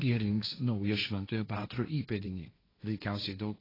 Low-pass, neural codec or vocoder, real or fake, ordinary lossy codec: 5.4 kHz; codec, 16 kHz, 1.1 kbps, Voila-Tokenizer; fake; AAC, 24 kbps